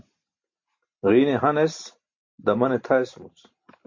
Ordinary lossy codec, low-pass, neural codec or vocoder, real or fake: MP3, 48 kbps; 7.2 kHz; none; real